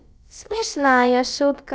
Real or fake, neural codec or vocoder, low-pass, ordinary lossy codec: fake; codec, 16 kHz, about 1 kbps, DyCAST, with the encoder's durations; none; none